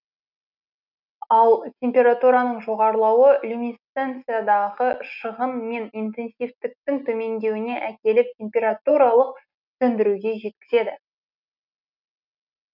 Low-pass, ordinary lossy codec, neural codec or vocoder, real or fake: 5.4 kHz; none; none; real